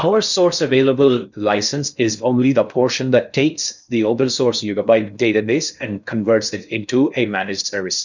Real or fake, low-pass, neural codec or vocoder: fake; 7.2 kHz; codec, 16 kHz in and 24 kHz out, 0.6 kbps, FocalCodec, streaming, 4096 codes